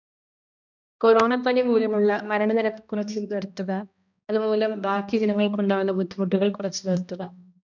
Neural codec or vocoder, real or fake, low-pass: codec, 16 kHz, 1 kbps, X-Codec, HuBERT features, trained on balanced general audio; fake; 7.2 kHz